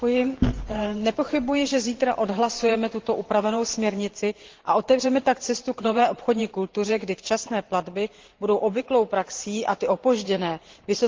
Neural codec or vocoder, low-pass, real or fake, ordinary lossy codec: vocoder, 44.1 kHz, 128 mel bands every 512 samples, BigVGAN v2; 7.2 kHz; fake; Opus, 16 kbps